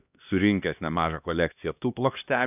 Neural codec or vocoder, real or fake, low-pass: codec, 16 kHz, 1 kbps, X-Codec, HuBERT features, trained on LibriSpeech; fake; 3.6 kHz